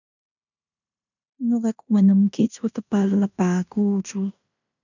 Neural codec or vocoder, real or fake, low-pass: codec, 16 kHz in and 24 kHz out, 0.9 kbps, LongCat-Audio-Codec, fine tuned four codebook decoder; fake; 7.2 kHz